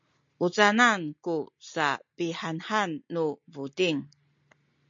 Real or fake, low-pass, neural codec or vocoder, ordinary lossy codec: real; 7.2 kHz; none; MP3, 48 kbps